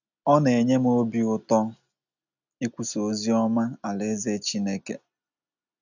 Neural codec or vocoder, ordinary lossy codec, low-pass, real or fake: none; none; 7.2 kHz; real